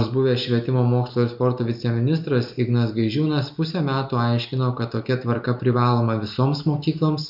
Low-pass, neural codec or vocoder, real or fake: 5.4 kHz; none; real